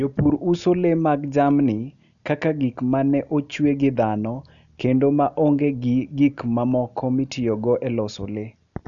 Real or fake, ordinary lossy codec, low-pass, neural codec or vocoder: real; none; 7.2 kHz; none